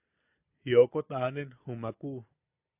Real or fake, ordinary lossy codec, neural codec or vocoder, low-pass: real; AAC, 32 kbps; none; 3.6 kHz